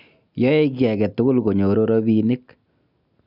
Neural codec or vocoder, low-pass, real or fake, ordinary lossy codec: none; 5.4 kHz; real; none